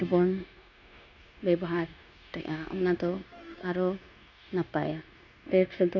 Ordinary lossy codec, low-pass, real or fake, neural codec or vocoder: none; 7.2 kHz; fake; codec, 16 kHz, 0.9 kbps, LongCat-Audio-Codec